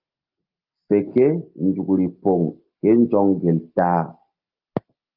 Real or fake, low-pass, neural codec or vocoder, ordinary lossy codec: real; 5.4 kHz; none; Opus, 32 kbps